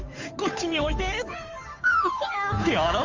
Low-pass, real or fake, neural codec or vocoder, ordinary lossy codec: 7.2 kHz; fake; codec, 16 kHz, 2 kbps, FunCodec, trained on Chinese and English, 25 frames a second; Opus, 32 kbps